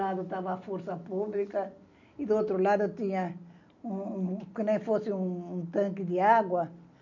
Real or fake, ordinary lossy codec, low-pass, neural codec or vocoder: real; none; 7.2 kHz; none